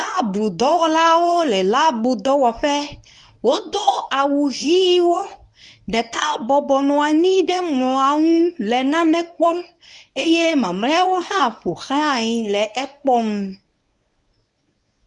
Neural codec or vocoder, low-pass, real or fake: codec, 24 kHz, 0.9 kbps, WavTokenizer, medium speech release version 1; 10.8 kHz; fake